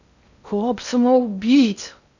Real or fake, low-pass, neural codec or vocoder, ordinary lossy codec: fake; 7.2 kHz; codec, 16 kHz in and 24 kHz out, 0.6 kbps, FocalCodec, streaming, 2048 codes; none